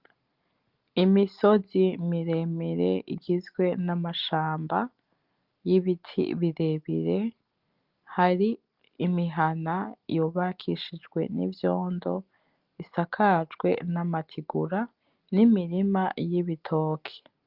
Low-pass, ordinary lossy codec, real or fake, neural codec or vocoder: 5.4 kHz; Opus, 32 kbps; real; none